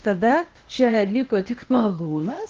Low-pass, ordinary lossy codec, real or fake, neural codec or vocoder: 7.2 kHz; Opus, 16 kbps; fake; codec, 16 kHz, 0.8 kbps, ZipCodec